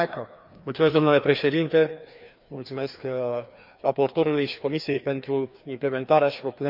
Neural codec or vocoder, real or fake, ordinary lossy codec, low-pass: codec, 16 kHz, 2 kbps, FreqCodec, larger model; fake; MP3, 48 kbps; 5.4 kHz